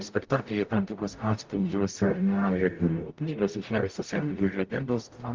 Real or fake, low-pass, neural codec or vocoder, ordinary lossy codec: fake; 7.2 kHz; codec, 44.1 kHz, 0.9 kbps, DAC; Opus, 32 kbps